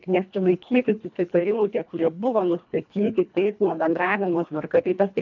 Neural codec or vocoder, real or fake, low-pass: codec, 24 kHz, 1.5 kbps, HILCodec; fake; 7.2 kHz